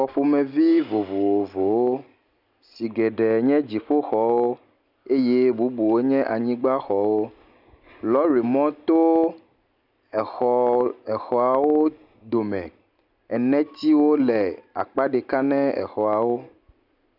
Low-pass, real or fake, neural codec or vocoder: 5.4 kHz; real; none